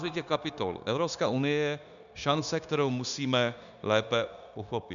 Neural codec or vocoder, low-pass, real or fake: codec, 16 kHz, 0.9 kbps, LongCat-Audio-Codec; 7.2 kHz; fake